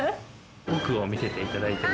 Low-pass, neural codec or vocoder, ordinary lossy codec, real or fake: none; none; none; real